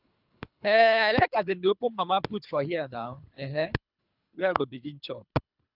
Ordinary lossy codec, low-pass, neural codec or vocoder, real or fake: none; 5.4 kHz; codec, 24 kHz, 3 kbps, HILCodec; fake